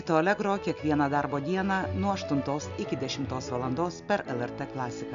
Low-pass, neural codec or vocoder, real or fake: 7.2 kHz; none; real